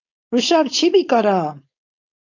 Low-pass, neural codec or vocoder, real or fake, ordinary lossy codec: 7.2 kHz; codec, 16 kHz, 4.8 kbps, FACodec; fake; AAC, 48 kbps